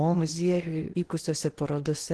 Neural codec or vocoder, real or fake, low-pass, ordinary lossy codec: codec, 16 kHz in and 24 kHz out, 0.8 kbps, FocalCodec, streaming, 65536 codes; fake; 10.8 kHz; Opus, 16 kbps